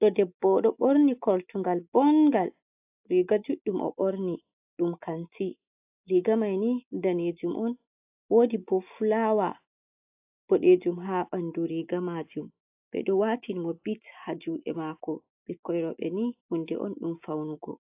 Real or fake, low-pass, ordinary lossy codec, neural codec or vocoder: real; 3.6 kHz; AAC, 32 kbps; none